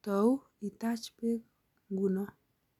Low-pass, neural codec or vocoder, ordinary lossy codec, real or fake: 19.8 kHz; none; none; real